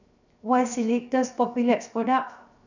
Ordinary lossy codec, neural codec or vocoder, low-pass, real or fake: none; codec, 16 kHz, 0.7 kbps, FocalCodec; 7.2 kHz; fake